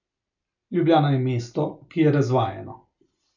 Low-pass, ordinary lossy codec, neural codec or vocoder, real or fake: 7.2 kHz; none; none; real